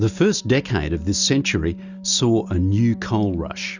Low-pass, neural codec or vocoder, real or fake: 7.2 kHz; none; real